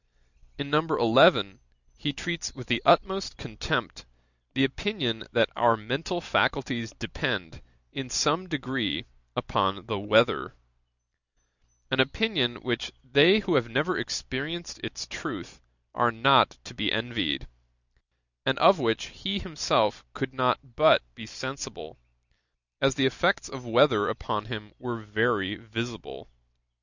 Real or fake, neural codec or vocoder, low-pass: real; none; 7.2 kHz